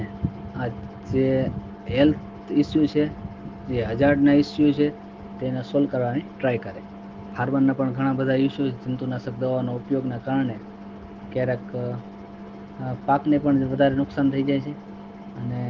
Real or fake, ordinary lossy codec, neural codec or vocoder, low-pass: real; Opus, 16 kbps; none; 7.2 kHz